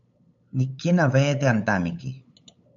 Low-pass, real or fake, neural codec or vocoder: 7.2 kHz; fake; codec, 16 kHz, 8 kbps, FunCodec, trained on LibriTTS, 25 frames a second